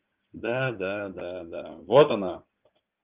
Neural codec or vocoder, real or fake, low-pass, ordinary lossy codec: codec, 16 kHz in and 24 kHz out, 2.2 kbps, FireRedTTS-2 codec; fake; 3.6 kHz; Opus, 24 kbps